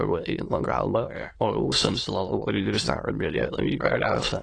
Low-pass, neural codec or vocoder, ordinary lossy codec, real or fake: 9.9 kHz; autoencoder, 22.05 kHz, a latent of 192 numbers a frame, VITS, trained on many speakers; AAC, 32 kbps; fake